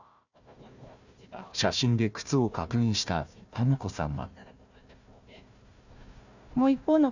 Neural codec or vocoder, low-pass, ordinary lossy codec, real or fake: codec, 16 kHz, 1 kbps, FunCodec, trained on Chinese and English, 50 frames a second; 7.2 kHz; none; fake